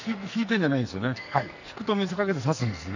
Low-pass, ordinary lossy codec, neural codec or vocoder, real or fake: 7.2 kHz; none; codec, 44.1 kHz, 2.6 kbps, SNAC; fake